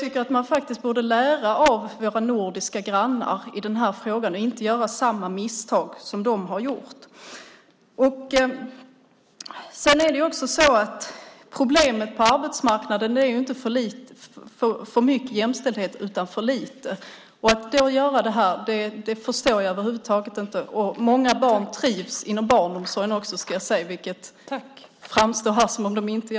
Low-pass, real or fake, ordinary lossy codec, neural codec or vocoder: none; real; none; none